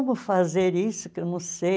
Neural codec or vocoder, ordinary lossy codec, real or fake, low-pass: none; none; real; none